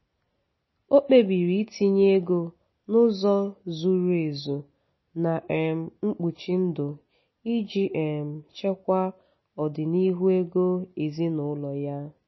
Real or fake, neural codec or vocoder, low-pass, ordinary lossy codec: real; none; 7.2 kHz; MP3, 24 kbps